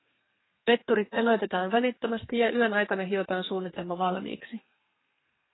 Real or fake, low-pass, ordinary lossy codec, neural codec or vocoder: fake; 7.2 kHz; AAC, 16 kbps; codec, 32 kHz, 1.9 kbps, SNAC